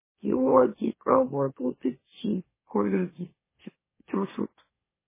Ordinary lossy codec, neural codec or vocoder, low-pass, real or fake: MP3, 16 kbps; autoencoder, 44.1 kHz, a latent of 192 numbers a frame, MeloTTS; 3.6 kHz; fake